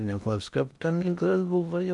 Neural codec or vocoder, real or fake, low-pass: codec, 16 kHz in and 24 kHz out, 0.6 kbps, FocalCodec, streaming, 2048 codes; fake; 10.8 kHz